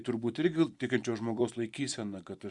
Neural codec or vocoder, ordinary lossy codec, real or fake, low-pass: vocoder, 44.1 kHz, 128 mel bands every 256 samples, BigVGAN v2; Opus, 64 kbps; fake; 10.8 kHz